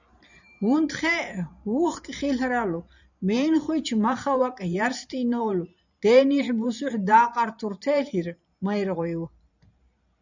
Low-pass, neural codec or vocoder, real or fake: 7.2 kHz; vocoder, 44.1 kHz, 128 mel bands every 512 samples, BigVGAN v2; fake